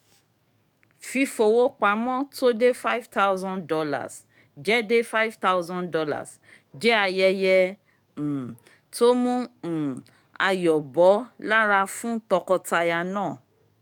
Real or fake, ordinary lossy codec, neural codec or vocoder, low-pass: fake; none; codec, 44.1 kHz, 7.8 kbps, DAC; 19.8 kHz